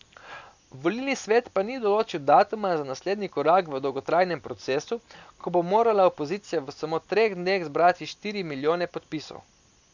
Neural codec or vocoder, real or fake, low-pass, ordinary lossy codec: none; real; 7.2 kHz; none